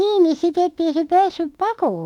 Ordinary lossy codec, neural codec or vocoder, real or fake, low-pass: none; autoencoder, 48 kHz, 32 numbers a frame, DAC-VAE, trained on Japanese speech; fake; 19.8 kHz